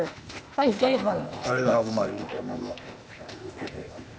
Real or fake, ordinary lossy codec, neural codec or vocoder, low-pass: fake; none; codec, 16 kHz, 0.8 kbps, ZipCodec; none